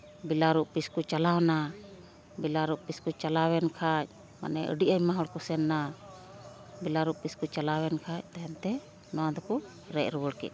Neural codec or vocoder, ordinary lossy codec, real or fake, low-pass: none; none; real; none